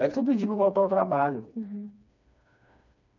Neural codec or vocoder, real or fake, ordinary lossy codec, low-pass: codec, 16 kHz, 2 kbps, FreqCodec, smaller model; fake; none; 7.2 kHz